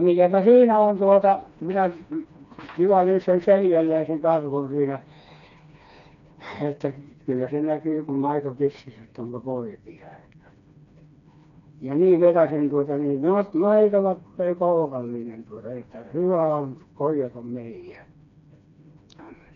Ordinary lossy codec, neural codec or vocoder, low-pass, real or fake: none; codec, 16 kHz, 2 kbps, FreqCodec, smaller model; 7.2 kHz; fake